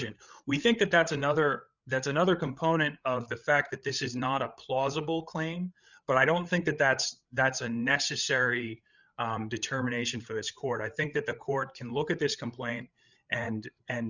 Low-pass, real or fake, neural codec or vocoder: 7.2 kHz; fake; codec, 16 kHz, 8 kbps, FreqCodec, larger model